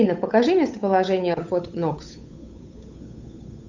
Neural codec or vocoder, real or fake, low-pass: codec, 16 kHz, 8 kbps, FunCodec, trained on Chinese and English, 25 frames a second; fake; 7.2 kHz